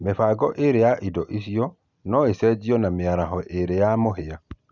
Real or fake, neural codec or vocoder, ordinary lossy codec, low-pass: real; none; none; 7.2 kHz